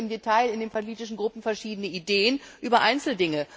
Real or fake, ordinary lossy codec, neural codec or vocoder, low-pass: real; none; none; none